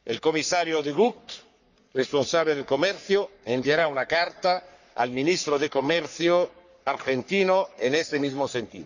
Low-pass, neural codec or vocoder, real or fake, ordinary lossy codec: 7.2 kHz; codec, 44.1 kHz, 3.4 kbps, Pupu-Codec; fake; none